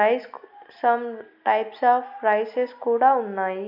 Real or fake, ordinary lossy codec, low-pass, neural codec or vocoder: real; none; 5.4 kHz; none